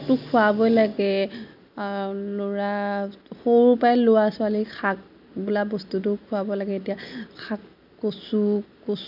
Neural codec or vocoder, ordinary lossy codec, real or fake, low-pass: none; none; real; 5.4 kHz